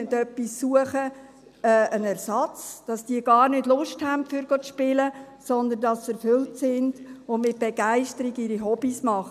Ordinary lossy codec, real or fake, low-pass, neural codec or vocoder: none; real; 14.4 kHz; none